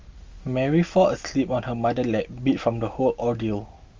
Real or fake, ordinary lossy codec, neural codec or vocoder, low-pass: real; Opus, 32 kbps; none; 7.2 kHz